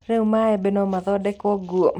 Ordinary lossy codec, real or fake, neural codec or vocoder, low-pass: none; real; none; 19.8 kHz